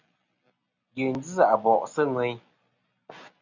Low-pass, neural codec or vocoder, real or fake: 7.2 kHz; none; real